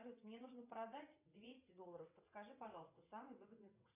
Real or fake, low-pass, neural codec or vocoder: fake; 3.6 kHz; vocoder, 22.05 kHz, 80 mel bands, WaveNeXt